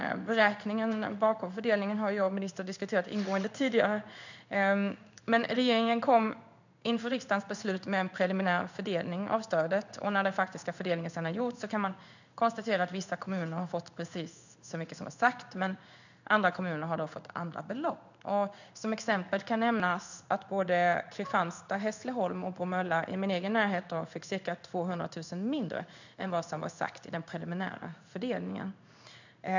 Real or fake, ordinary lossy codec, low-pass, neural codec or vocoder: fake; none; 7.2 kHz; codec, 16 kHz in and 24 kHz out, 1 kbps, XY-Tokenizer